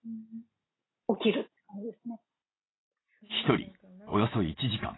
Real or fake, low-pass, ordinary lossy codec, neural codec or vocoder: real; 7.2 kHz; AAC, 16 kbps; none